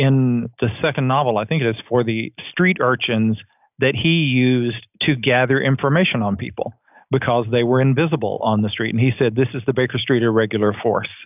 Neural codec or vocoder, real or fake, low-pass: none; real; 3.6 kHz